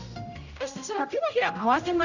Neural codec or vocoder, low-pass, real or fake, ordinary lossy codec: codec, 16 kHz, 0.5 kbps, X-Codec, HuBERT features, trained on general audio; 7.2 kHz; fake; none